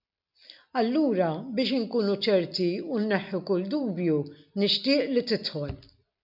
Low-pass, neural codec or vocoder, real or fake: 5.4 kHz; none; real